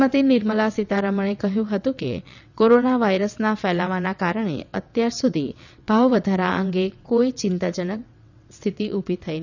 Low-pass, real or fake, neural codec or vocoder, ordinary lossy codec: 7.2 kHz; fake; vocoder, 22.05 kHz, 80 mel bands, WaveNeXt; none